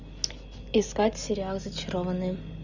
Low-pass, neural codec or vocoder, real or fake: 7.2 kHz; none; real